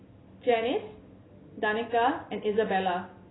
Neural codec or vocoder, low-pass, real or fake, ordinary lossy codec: none; 7.2 kHz; real; AAC, 16 kbps